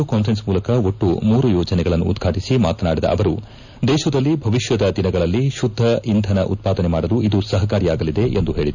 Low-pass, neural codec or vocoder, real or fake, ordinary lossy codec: 7.2 kHz; none; real; none